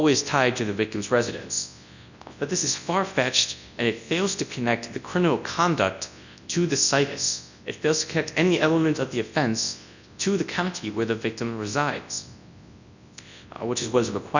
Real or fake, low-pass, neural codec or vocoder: fake; 7.2 kHz; codec, 24 kHz, 0.9 kbps, WavTokenizer, large speech release